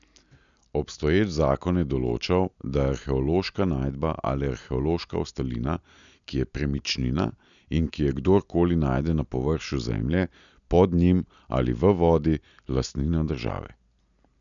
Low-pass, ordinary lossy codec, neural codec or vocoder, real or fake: 7.2 kHz; none; none; real